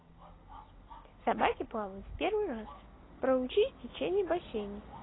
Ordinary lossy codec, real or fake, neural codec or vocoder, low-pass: AAC, 16 kbps; real; none; 7.2 kHz